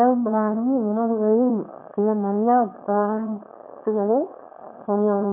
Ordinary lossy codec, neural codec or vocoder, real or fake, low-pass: AAC, 32 kbps; autoencoder, 22.05 kHz, a latent of 192 numbers a frame, VITS, trained on one speaker; fake; 3.6 kHz